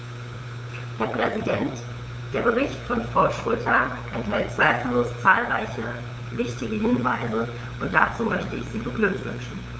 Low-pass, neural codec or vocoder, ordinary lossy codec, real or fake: none; codec, 16 kHz, 8 kbps, FunCodec, trained on LibriTTS, 25 frames a second; none; fake